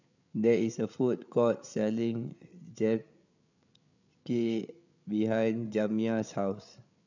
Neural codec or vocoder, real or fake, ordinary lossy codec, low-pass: codec, 16 kHz, 8 kbps, FreqCodec, larger model; fake; none; 7.2 kHz